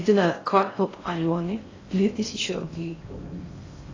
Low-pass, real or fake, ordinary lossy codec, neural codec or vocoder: 7.2 kHz; fake; AAC, 32 kbps; codec, 16 kHz in and 24 kHz out, 0.6 kbps, FocalCodec, streaming, 4096 codes